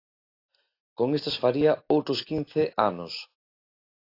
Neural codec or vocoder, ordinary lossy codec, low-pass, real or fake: none; AAC, 32 kbps; 5.4 kHz; real